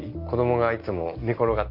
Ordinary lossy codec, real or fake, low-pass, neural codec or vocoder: Opus, 32 kbps; real; 5.4 kHz; none